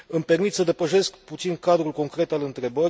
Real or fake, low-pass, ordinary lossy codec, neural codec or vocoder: real; none; none; none